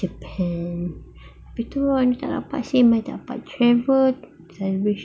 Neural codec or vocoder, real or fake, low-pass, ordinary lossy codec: none; real; none; none